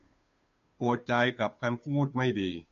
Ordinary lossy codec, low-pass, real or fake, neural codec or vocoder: MP3, 48 kbps; 7.2 kHz; fake; codec, 16 kHz, 2 kbps, FunCodec, trained on Chinese and English, 25 frames a second